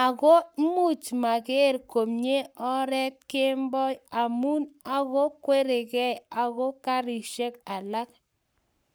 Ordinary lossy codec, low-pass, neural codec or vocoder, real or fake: none; none; codec, 44.1 kHz, 7.8 kbps, Pupu-Codec; fake